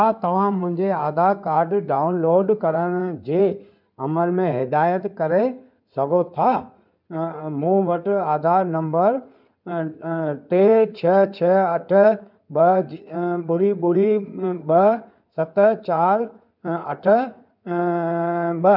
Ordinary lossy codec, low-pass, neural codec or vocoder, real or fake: none; 5.4 kHz; codec, 16 kHz in and 24 kHz out, 2.2 kbps, FireRedTTS-2 codec; fake